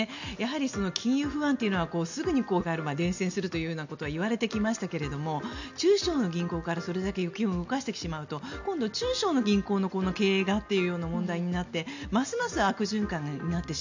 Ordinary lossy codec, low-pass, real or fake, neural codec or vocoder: none; 7.2 kHz; real; none